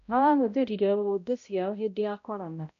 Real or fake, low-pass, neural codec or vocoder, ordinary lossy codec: fake; 7.2 kHz; codec, 16 kHz, 0.5 kbps, X-Codec, HuBERT features, trained on balanced general audio; none